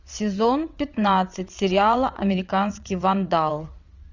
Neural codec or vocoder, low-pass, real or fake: vocoder, 22.05 kHz, 80 mel bands, WaveNeXt; 7.2 kHz; fake